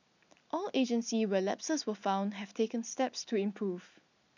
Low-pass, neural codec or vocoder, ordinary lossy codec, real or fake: 7.2 kHz; none; none; real